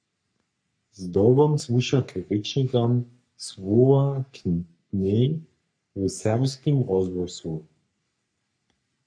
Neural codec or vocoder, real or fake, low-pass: codec, 44.1 kHz, 3.4 kbps, Pupu-Codec; fake; 9.9 kHz